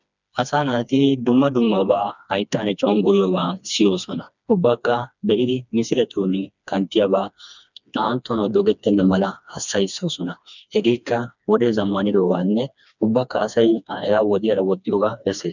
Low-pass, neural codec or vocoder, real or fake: 7.2 kHz; codec, 16 kHz, 2 kbps, FreqCodec, smaller model; fake